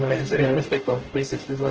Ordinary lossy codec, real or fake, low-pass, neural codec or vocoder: Opus, 16 kbps; fake; 7.2 kHz; codec, 44.1 kHz, 0.9 kbps, DAC